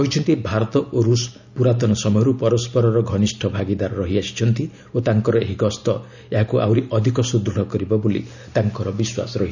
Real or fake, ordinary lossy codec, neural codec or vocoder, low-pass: real; none; none; 7.2 kHz